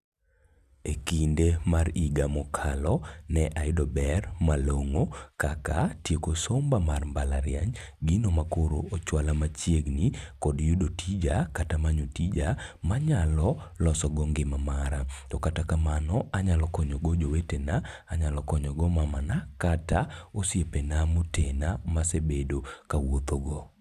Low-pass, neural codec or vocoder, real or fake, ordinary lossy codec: 14.4 kHz; none; real; none